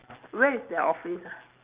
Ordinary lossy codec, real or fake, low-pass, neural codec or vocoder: Opus, 24 kbps; real; 3.6 kHz; none